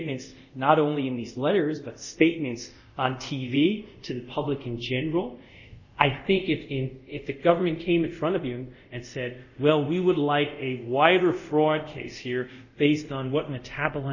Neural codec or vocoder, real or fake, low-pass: codec, 24 kHz, 0.5 kbps, DualCodec; fake; 7.2 kHz